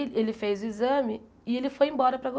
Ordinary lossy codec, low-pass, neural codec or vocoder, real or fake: none; none; none; real